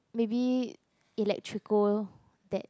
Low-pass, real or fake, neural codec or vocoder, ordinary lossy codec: none; real; none; none